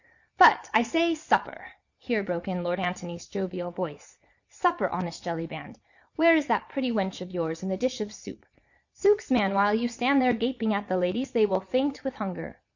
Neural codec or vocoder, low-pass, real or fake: vocoder, 22.05 kHz, 80 mel bands, Vocos; 7.2 kHz; fake